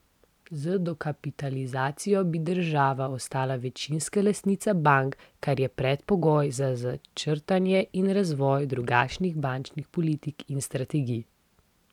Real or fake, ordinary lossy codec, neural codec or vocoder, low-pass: real; none; none; 19.8 kHz